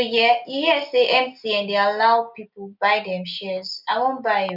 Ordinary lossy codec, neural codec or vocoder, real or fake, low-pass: none; none; real; 5.4 kHz